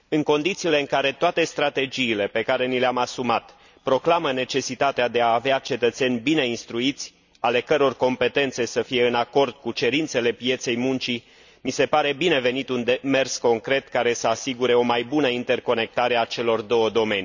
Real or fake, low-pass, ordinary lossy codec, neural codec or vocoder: real; 7.2 kHz; none; none